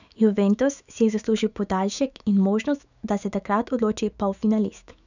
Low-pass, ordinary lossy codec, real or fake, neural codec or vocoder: 7.2 kHz; none; fake; autoencoder, 48 kHz, 128 numbers a frame, DAC-VAE, trained on Japanese speech